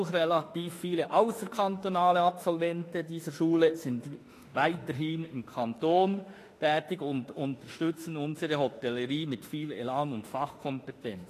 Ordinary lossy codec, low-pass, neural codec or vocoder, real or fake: AAC, 48 kbps; 14.4 kHz; autoencoder, 48 kHz, 32 numbers a frame, DAC-VAE, trained on Japanese speech; fake